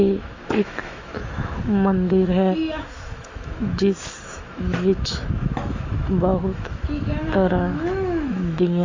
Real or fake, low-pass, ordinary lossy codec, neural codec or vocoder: fake; 7.2 kHz; AAC, 32 kbps; autoencoder, 48 kHz, 128 numbers a frame, DAC-VAE, trained on Japanese speech